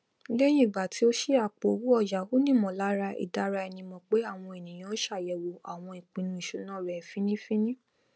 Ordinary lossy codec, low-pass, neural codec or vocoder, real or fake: none; none; none; real